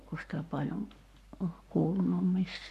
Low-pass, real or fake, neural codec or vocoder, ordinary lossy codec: 14.4 kHz; fake; codec, 44.1 kHz, 7.8 kbps, Pupu-Codec; none